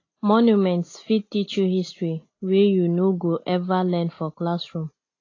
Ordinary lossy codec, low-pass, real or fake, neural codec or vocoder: AAC, 32 kbps; 7.2 kHz; real; none